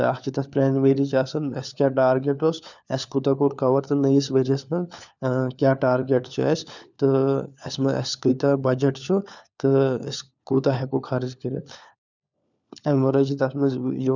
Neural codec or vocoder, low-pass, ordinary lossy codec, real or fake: codec, 16 kHz, 4 kbps, FunCodec, trained on LibriTTS, 50 frames a second; 7.2 kHz; none; fake